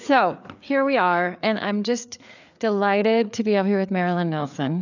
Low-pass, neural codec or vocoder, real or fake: 7.2 kHz; codec, 16 kHz, 4 kbps, FreqCodec, larger model; fake